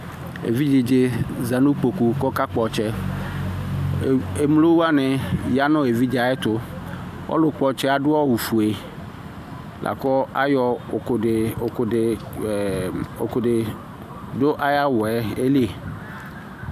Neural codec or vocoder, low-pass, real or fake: none; 14.4 kHz; real